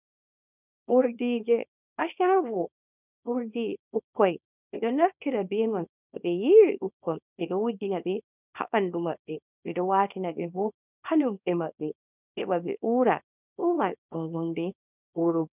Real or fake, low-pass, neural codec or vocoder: fake; 3.6 kHz; codec, 24 kHz, 0.9 kbps, WavTokenizer, small release